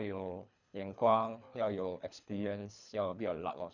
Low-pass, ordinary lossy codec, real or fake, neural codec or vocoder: 7.2 kHz; none; fake; codec, 24 kHz, 3 kbps, HILCodec